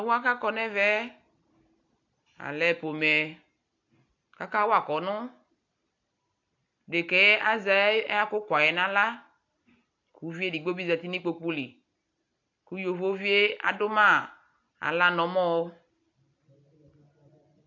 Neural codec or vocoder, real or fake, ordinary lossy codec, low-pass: none; real; AAC, 48 kbps; 7.2 kHz